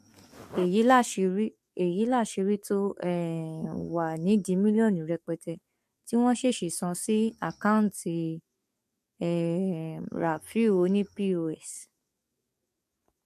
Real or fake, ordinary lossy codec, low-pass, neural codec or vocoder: fake; MP3, 64 kbps; 14.4 kHz; codec, 44.1 kHz, 7.8 kbps, DAC